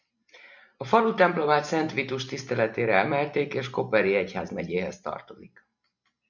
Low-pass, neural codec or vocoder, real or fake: 7.2 kHz; none; real